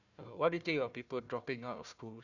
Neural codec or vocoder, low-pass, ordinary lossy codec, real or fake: codec, 16 kHz, 1 kbps, FunCodec, trained on Chinese and English, 50 frames a second; 7.2 kHz; none; fake